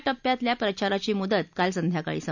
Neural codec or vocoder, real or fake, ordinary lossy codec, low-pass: none; real; none; 7.2 kHz